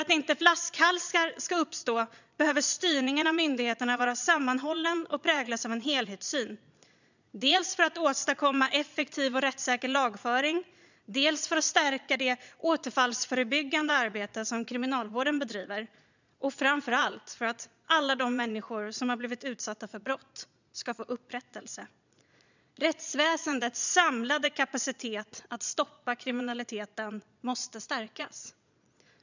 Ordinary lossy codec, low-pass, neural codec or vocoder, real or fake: none; 7.2 kHz; vocoder, 22.05 kHz, 80 mel bands, Vocos; fake